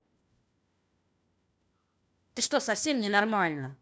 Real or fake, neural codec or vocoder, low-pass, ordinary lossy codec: fake; codec, 16 kHz, 1 kbps, FunCodec, trained on LibriTTS, 50 frames a second; none; none